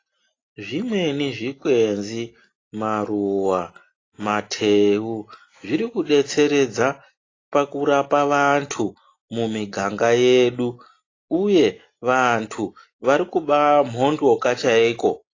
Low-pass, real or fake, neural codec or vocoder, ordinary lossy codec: 7.2 kHz; real; none; AAC, 32 kbps